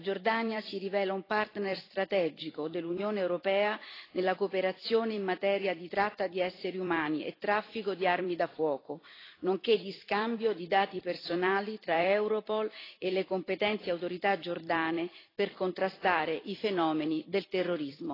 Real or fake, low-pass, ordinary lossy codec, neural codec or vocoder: fake; 5.4 kHz; AAC, 24 kbps; vocoder, 44.1 kHz, 128 mel bands every 256 samples, BigVGAN v2